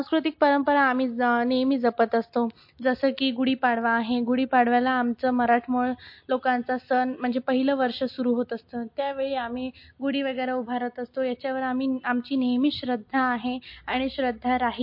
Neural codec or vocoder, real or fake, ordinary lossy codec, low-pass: none; real; MP3, 32 kbps; 5.4 kHz